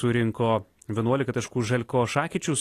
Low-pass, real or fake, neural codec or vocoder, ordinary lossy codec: 14.4 kHz; real; none; AAC, 48 kbps